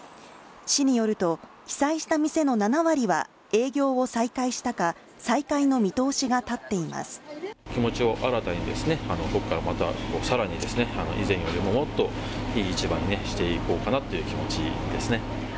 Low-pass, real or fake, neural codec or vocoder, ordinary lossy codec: none; real; none; none